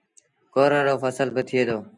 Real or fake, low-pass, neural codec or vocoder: real; 10.8 kHz; none